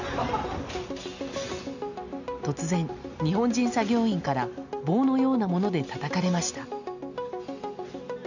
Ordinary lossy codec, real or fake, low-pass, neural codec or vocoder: none; real; 7.2 kHz; none